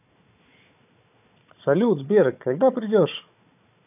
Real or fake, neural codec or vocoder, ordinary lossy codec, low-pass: fake; codec, 16 kHz, 4 kbps, FunCodec, trained on Chinese and English, 50 frames a second; none; 3.6 kHz